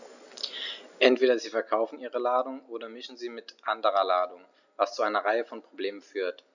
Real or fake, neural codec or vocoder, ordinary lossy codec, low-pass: real; none; none; 7.2 kHz